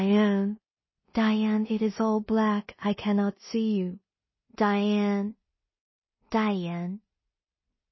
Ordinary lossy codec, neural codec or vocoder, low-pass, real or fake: MP3, 24 kbps; codec, 16 kHz in and 24 kHz out, 0.4 kbps, LongCat-Audio-Codec, two codebook decoder; 7.2 kHz; fake